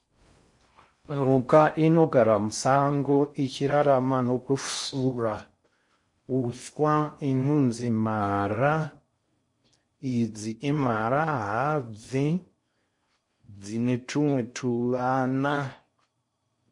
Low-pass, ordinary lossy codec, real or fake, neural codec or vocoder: 10.8 kHz; MP3, 48 kbps; fake; codec, 16 kHz in and 24 kHz out, 0.6 kbps, FocalCodec, streaming, 4096 codes